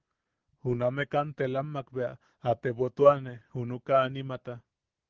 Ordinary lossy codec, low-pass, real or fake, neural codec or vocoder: Opus, 16 kbps; 7.2 kHz; real; none